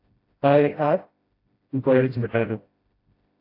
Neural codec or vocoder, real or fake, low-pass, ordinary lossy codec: codec, 16 kHz, 0.5 kbps, FreqCodec, smaller model; fake; 5.4 kHz; AAC, 32 kbps